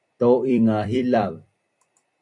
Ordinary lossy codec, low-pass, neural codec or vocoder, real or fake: AAC, 64 kbps; 10.8 kHz; vocoder, 44.1 kHz, 128 mel bands every 256 samples, BigVGAN v2; fake